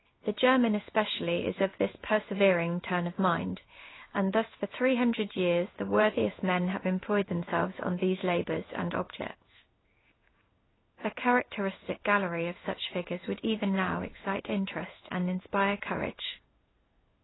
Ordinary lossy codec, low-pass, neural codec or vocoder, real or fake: AAC, 16 kbps; 7.2 kHz; codec, 16 kHz in and 24 kHz out, 1 kbps, XY-Tokenizer; fake